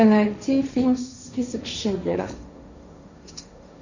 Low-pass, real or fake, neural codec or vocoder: 7.2 kHz; fake; codec, 16 kHz, 1.1 kbps, Voila-Tokenizer